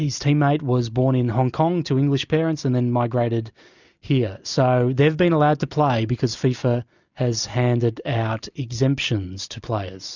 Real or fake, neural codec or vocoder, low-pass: real; none; 7.2 kHz